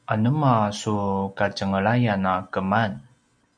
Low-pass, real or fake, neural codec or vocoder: 9.9 kHz; real; none